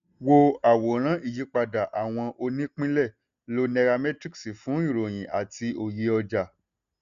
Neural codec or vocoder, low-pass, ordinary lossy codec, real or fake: none; 7.2 kHz; none; real